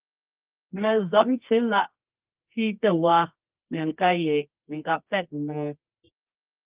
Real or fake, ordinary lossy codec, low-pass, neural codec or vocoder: fake; Opus, 24 kbps; 3.6 kHz; codec, 24 kHz, 0.9 kbps, WavTokenizer, medium music audio release